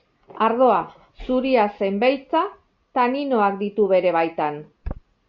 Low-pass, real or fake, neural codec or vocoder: 7.2 kHz; real; none